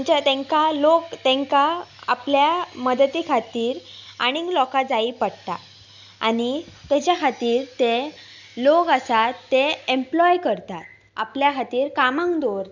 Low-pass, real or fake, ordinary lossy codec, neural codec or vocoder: 7.2 kHz; real; none; none